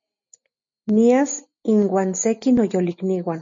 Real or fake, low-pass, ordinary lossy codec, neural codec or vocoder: real; 7.2 kHz; MP3, 48 kbps; none